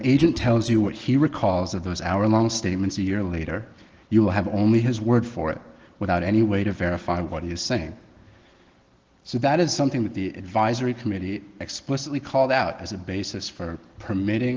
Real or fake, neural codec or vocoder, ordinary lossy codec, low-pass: real; none; Opus, 16 kbps; 7.2 kHz